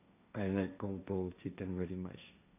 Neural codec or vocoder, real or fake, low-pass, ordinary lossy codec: codec, 16 kHz, 1.1 kbps, Voila-Tokenizer; fake; 3.6 kHz; none